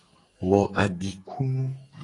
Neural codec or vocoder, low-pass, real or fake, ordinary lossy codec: codec, 44.1 kHz, 2.6 kbps, SNAC; 10.8 kHz; fake; AAC, 32 kbps